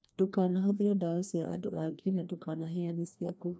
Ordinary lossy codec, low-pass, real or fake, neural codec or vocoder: none; none; fake; codec, 16 kHz, 1 kbps, FreqCodec, larger model